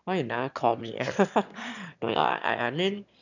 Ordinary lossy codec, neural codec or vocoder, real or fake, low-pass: none; autoencoder, 22.05 kHz, a latent of 192 numbers a frame, VITS, trained on one speaker; fake; 7.2 kHz